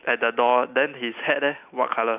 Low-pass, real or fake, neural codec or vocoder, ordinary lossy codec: 3.6 kHz; real; none; none